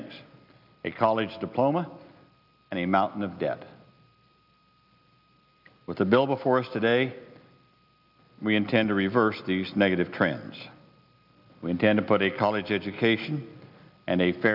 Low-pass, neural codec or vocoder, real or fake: 5.4 kHz; none; real